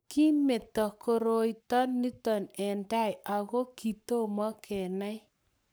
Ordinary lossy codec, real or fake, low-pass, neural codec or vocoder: none; fake; none; codec, 44.1 kHz, 7.8 kbps, Pupu-Codec